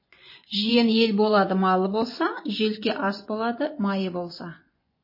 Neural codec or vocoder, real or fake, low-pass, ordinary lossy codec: vocoder, 24 kHz, 100 mel bands, Vocos; fake; 5.4 kHz; MP3, 24 kbps